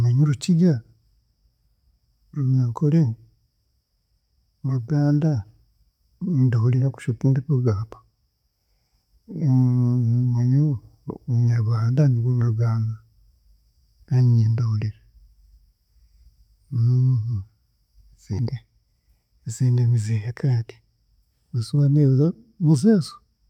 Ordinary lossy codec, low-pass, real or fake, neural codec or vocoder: none; 19.8 kHz; real; none